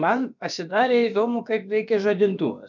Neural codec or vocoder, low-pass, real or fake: codec, 16 kHz, about 1 kbps, DyCAST, with the encoder's durations; 7.2 kHz; fake